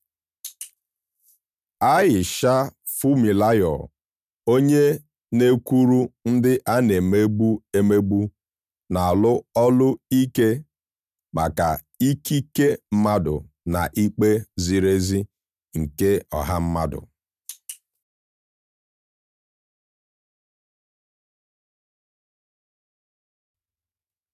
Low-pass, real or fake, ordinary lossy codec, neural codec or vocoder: 14.4 kHz; real; none; none